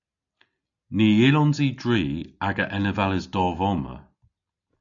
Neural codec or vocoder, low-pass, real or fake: none; 7.2 kHz; real